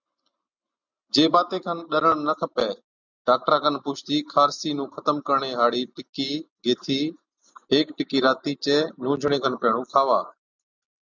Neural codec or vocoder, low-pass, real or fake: none; 7.2 kHz; real